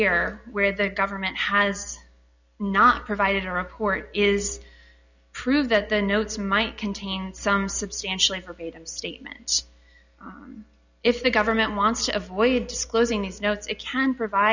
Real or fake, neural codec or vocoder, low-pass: real; none; 7.2 kHz